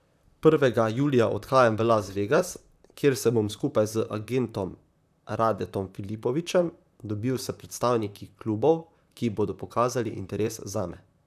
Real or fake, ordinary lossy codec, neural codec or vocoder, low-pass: fake; none; vocoder, 44.1 kHz, 128 mel bands, Pupu-Vocoder; 14.4 kHz